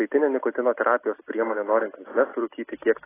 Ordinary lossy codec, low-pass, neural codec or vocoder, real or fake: AAC, 16 kbps; 3.6 kHz; none; real